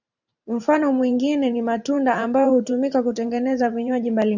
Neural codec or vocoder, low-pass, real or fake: vocoder, 44.1 kHz, 128 mel bands every 512 samples, BigVGAN v2; 7.2 kHz; fake